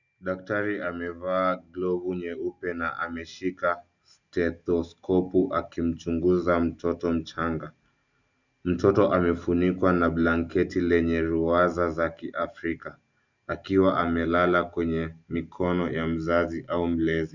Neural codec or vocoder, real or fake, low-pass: none; real; 7.2 kHz